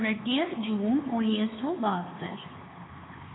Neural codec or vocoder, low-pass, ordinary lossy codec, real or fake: codec, 16 kHz, 4 kbps, X-Codec, HuBERT features, trained on LibriSpeech; 7.2 kHz; AAC, 16 kbps; fake